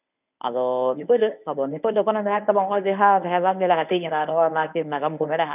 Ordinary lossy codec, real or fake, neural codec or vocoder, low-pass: none; fake; codec, 24 kHz, 0.9 kbps, WavTokenizer, medium speech release version 1; 3.6 kHz